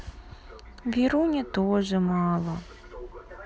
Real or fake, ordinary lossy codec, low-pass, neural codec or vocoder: real; none; none; none